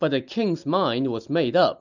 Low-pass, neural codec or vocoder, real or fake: 7.2 kHz; none; real